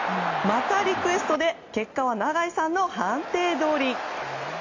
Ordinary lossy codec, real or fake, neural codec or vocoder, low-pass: AAC, 48 kbps; real; none; 7.2 kHz